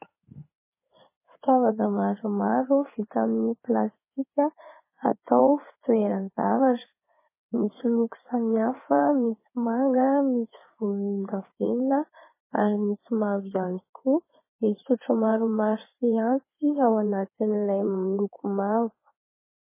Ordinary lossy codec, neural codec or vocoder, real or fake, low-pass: MP3, 16 kbps; codec, 16 kHz in and 24 kHz out, 2.2 kbps, FireRedTTS-2 codec; fake; 3.6 kHz